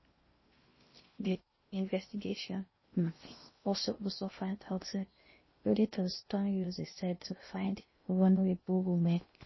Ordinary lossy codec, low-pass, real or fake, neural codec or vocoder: MP3, 24 kbps; 7.2 kHz; fake; codec, 16 kHz in and 24 kHz out, 0.6 kbps, FocalCodec, streaming, 4096 codes